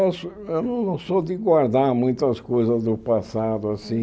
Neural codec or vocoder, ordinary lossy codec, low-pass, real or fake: none; none; none; real